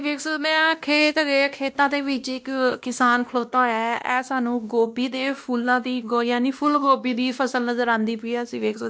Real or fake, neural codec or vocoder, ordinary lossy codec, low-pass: fake; codec, 16 kHz, 1 kbps, X-Codec, WavLM features, trained on Multilingual LibriSpeech; none; none